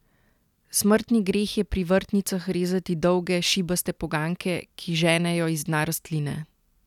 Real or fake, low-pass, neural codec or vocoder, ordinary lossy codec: real; 19.8 kHz; none; none